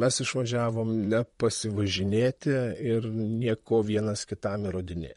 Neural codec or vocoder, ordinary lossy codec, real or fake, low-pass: vocoder, 44.1 kHz, 128 mel bands, Pupu-Vocoder; MP3, 48 kbps; fake; 19.8 kHz